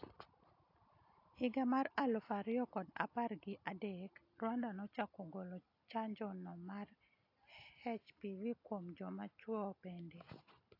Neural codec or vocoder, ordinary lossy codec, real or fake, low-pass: none; AAC, 32 kbps; real; 5.4 kHz